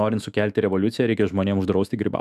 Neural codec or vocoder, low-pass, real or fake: autoencoder, 48 kHz, 128 numbers a frame, DAC-VAE, trained on Japanese speech; 14.4 kHz; fake